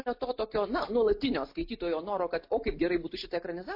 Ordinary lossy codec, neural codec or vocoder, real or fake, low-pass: MP3, 32 kbps; none; real; 5.4 kHz